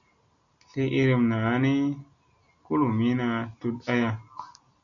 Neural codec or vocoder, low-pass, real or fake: none; 7.2 kHz; real